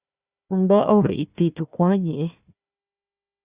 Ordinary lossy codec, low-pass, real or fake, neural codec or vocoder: Opus, 64 kbps; 3.6 kHz; fake; codec, 16 kHz, 1 kbps, FunCodec, trained on Chinese and English, 50 frames a second